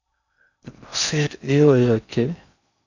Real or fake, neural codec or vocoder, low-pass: fake; codec, 16 kHz in and 24 kHz out, 0.6 kbps, FocalCodec, streaming, 4096 codes; 7.2 kHz